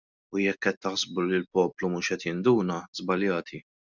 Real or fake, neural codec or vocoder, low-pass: real; none; 7.2 kHz